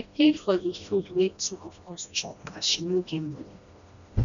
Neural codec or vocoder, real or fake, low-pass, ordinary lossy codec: codec, 16 kHz, 1 kbps, FreqCodec, smaller model; fake; 7.2 kHz; none